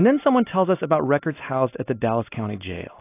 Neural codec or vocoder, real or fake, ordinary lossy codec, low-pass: none; real; AAC, 24 kbps; 3.6 kHz